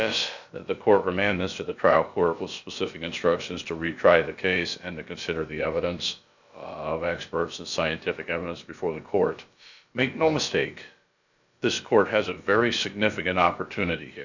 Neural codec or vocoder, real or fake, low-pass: codec, 16 kHz, about 1 kbps, DyCAST, with the encoder's durations; fake; 7.2 kHz